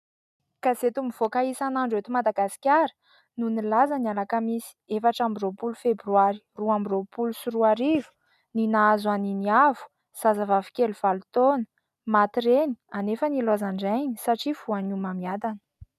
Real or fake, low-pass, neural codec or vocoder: real; 14.4 kHz; none